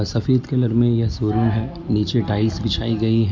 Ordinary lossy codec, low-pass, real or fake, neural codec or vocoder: none; none; real; none